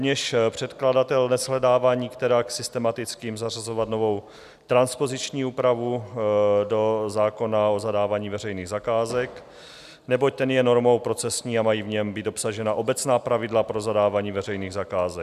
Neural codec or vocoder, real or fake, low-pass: none; real; 14.4 kHz